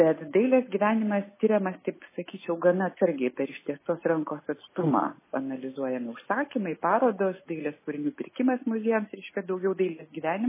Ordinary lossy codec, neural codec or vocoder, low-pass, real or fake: MP3, 16 kbps; none; 3.6 kHz; real